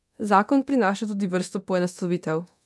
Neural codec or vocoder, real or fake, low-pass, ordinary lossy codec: codec, 24 kHz, 0.9 kbps, DualCodec; fake; none; none